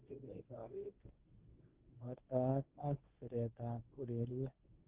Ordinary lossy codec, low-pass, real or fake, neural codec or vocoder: Opus, 16 kbps; 3.6 kHz; fake; codec, 24 kHz, 0.9 kbps, WavTokenizer, medium speech release version 1